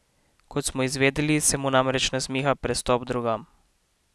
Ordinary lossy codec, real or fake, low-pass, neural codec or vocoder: none; real; none; none